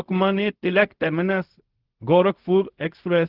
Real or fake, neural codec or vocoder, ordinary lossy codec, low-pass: fake; codec, 16 kHz, 0.4 kbps, LongCat-Audio-Codec; Opus, 16 kbps; 5.4 kHz